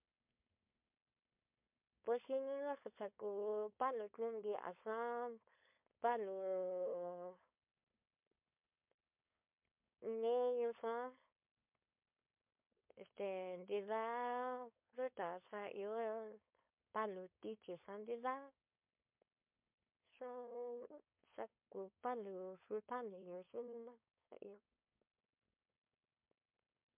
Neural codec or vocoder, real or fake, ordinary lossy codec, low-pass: codec, 16 kHz, 4.8 kbps, FACodec; fake; MP3, 32 kbps; 3.6 kHz